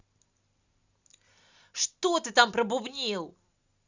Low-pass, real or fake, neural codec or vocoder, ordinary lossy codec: 7.2 kHz; real; none; Opus, 64 kbps